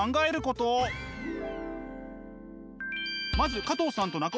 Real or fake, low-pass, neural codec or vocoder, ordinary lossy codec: real; none; none; none